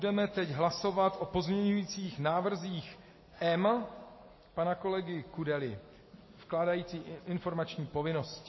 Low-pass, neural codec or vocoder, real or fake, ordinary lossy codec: 7.2 kHz; none; real; MP3, 24 kbps